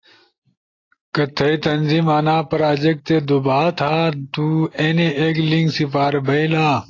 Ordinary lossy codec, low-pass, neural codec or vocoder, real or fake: AAC, 32 kbps; 7.2 kHz; none; real